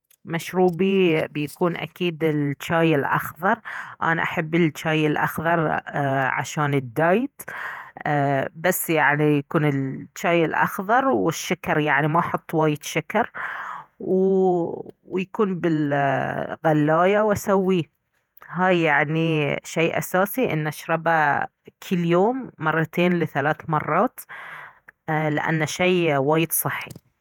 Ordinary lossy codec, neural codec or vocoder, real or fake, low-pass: none; vocoder, 48 kHz, 128 mel bands, Vocos; fake; 19.8 kHz